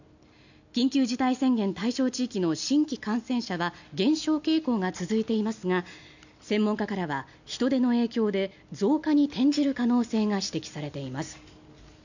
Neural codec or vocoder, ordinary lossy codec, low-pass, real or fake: none; none; 7.2 kHz; real